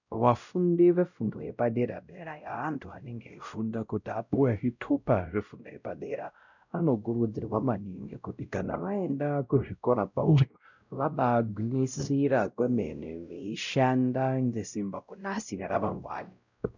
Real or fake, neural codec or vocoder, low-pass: fake; codec, 16 kHz, 0.5 kbps, X-Codec, WavLM features, trained on Multilingual LibriSpeech; 7.2 kHz